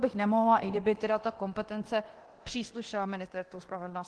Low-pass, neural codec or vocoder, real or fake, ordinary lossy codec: 10.8 kHz; codec, 24 kHz, 0.9 kbps, DualCodec; fake; Opus, 16 kbps